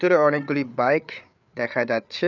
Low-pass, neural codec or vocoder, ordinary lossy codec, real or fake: 7.2 kHz; codec, 16 kHz, 8 kbps, FreqCodec, larger model; none; fake